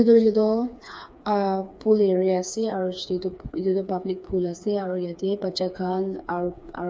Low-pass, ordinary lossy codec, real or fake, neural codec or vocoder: none; none; fake; codec, 16 kHz, 4 kbps, FreqCodec, smaller model